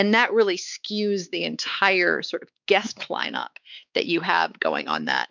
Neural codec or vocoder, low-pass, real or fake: codec, 16 kHz, 4 kbps, X-Codec, WavLM features, trained on Multilingual LibriSpeech; 7.2 kHz; fake